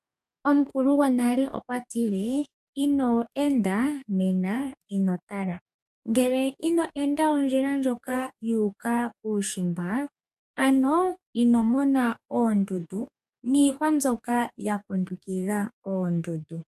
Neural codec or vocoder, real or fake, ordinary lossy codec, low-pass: codec, 44.1 kHz, 2.6 kbps, DAC; fake; AAC, 96 kbps; 14.4 kHz